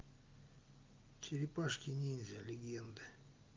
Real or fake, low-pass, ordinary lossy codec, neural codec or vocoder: real; 7.2 kHz; Opus, 24 kbps; none